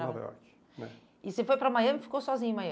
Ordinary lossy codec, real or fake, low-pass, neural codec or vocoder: none; real; none; none